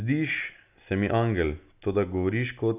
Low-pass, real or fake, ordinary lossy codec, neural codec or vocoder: 3.6 kHz; real; none; none